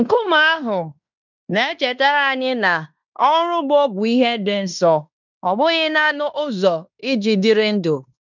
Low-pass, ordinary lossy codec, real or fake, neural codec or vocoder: 7.2 kHz; none; fake; codec, 16 kHz in and 24 kHz out, 0.9 kbps, LongCat-Audio-Codec, fine tuned four codebook decoder